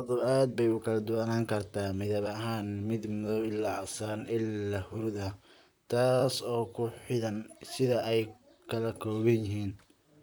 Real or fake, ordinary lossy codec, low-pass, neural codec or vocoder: fake; none; none; vocoder, 44.1 kHz, 128 mel bands, Pupu-Vocoder